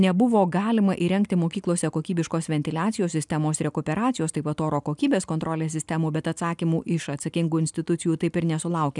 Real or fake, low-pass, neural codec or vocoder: real; 10.8 kHz; none